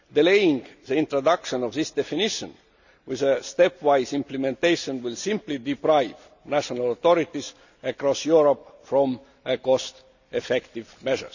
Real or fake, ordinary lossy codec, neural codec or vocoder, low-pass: real; none; none; 7.2 kHz